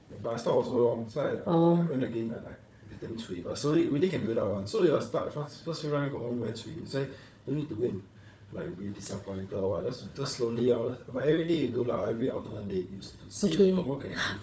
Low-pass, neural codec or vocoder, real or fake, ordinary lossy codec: none; codec, 16 kHz, 4 kbps, FunCodec, trained on Chinese and English, 50 frames a second; fake; none